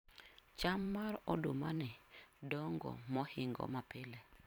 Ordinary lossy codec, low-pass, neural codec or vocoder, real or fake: none; 19.8 kHz; vocoder, 44.1 kHz, 128 mel bands every 512 samples, BigVGAN v2; fake